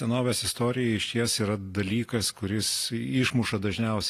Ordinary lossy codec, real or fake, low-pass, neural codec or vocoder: AAC, 48 kbps; real; 14.4 kHz; none